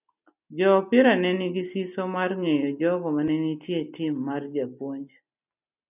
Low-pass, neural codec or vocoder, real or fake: 3.6 kHz; none; real